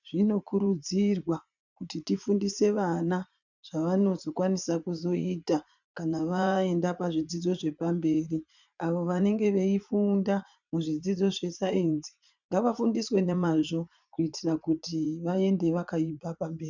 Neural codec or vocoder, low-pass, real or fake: vocoder, 44.1 kHz, 80 mel bands, Vocos; 7.2 kHz; fake